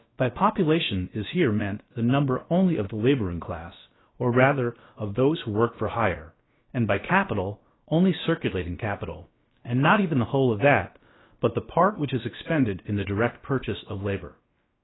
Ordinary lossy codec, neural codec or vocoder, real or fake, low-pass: AAC, 16 kbps; codec, 16 kHz, about 1 kbps, DyCAST, with the encoder's durations; fake; 7.2 kHz